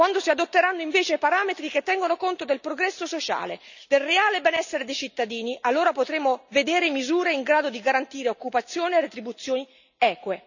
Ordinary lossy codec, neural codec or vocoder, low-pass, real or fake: none; none; 7.2 kHz; real